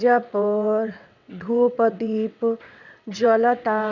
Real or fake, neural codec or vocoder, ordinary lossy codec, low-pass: fake; vocoder, 22.05 kHz, 80 mel bands, WaveNeXt; none; 7.2 kHz